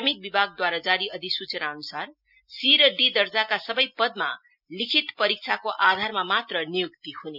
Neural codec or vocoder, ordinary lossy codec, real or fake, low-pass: none; none; real; 5.4 kHz